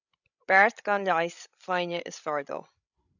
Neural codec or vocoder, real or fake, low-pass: codec, 16 kHz, 16 kbps, FreqCodec, larger model; fake; 7.2 kHz